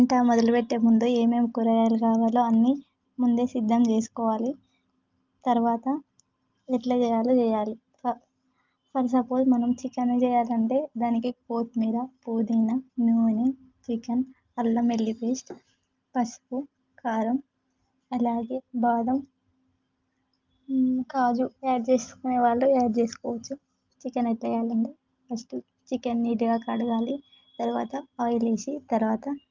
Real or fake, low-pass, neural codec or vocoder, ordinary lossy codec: real; 7.2 kHz; none; Opus, 24 kbps